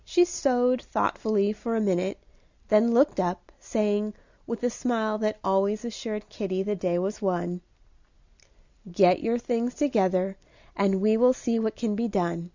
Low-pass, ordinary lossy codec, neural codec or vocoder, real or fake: 7.2 kHz; Opus, 64 kbps; none; real